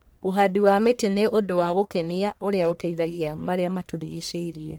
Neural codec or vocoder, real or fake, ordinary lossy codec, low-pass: codec, 44.1 kHz, 1.7 kbps, Pupu-Codec; fake; none; none